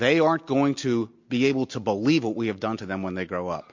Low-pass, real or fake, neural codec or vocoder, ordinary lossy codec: 7.2 kHz; real; none; MP3, 48 kbps